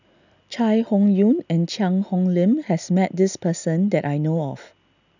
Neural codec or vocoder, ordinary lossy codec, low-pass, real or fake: none; none; 7.2 kHz; real